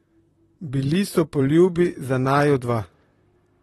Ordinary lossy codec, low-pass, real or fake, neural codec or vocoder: AAC, 32 kbps; 19.8 kHz; fake; vocoder, 44.1 kHz, 128 mel bands, Pupu-Vocoder